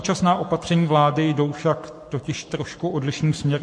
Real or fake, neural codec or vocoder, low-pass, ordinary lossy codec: fake; codec, 44.1 kHz, 7.8 kbps, Pupu-Codec; 9.9 kHz; MP3, 48 kbps